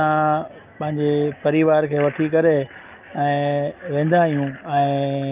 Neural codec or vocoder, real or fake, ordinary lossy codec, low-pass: none; real; Opus, 24 kbps; 3.6 kHz